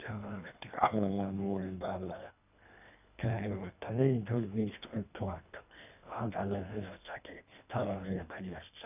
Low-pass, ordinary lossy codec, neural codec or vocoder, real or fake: 3.6 kHz; none; codec, 24 kHz, 1.5 kbps, HILCodec; fake